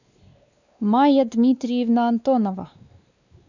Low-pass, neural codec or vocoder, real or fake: 7.2 kHz; codec, 16 kHz, 2 kbps, X-Codec, WavLM features, trained on Multilingual LibriSpeech; fake